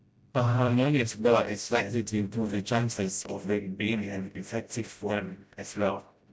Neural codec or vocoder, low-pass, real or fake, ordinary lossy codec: codec, 16 kHz, 0.5 kbps, FreqCodec, smaller model; none; fake; none